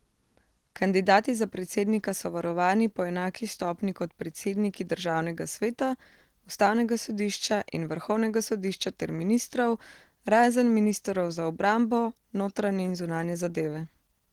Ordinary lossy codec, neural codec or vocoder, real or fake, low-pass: Opus, 16 kbps; none; real; 19.8 kHz